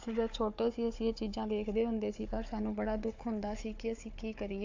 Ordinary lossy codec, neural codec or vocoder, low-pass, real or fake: AAC, 48 kbps; codec, 16 kHz, 4 kbps, FunCodec, trained on Chinese and English, 50 frames a second; 7.2 kHz; fake